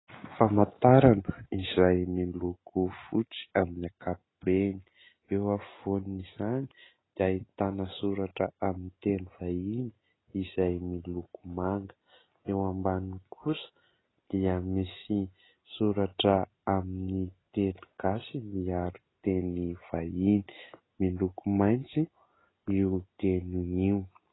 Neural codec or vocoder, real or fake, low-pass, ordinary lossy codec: none; real; 7.2 kHz; AAC, 16 kbps